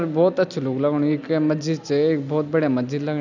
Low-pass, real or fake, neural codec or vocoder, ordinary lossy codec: 7.2 kHz; real; none; none